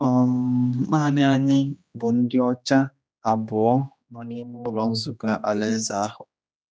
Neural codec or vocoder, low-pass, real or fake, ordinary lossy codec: codec, 16 kHz, 1 kbps, X-Codec, HuBERT features, trained on general audio; none; fake; none